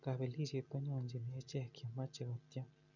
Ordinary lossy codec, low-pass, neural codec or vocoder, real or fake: none; 7.2 kHz; none; real